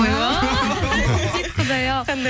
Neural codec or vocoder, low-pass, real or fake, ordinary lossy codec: none; none; real; none